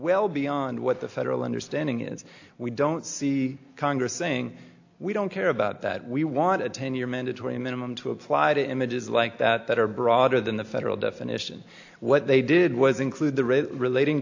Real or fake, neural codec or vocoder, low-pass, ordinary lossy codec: real; none; 7.2 kHz; AAC, 48 kbps